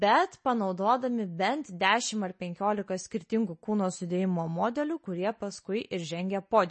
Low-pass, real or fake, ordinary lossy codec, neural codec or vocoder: 10.8 kHz; real; MP3, 32 kbps; none